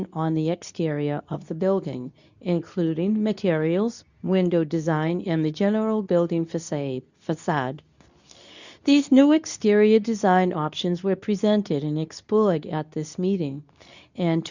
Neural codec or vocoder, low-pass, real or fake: codec, 24 kHz, 0.9 kbps, WavTokenizer, medium speech release version 2; 7.2 kHz; fake